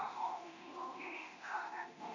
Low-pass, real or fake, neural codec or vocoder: 7.2 kHz; fake; codec, 24 kHz, 0.9 kbps, DualCodec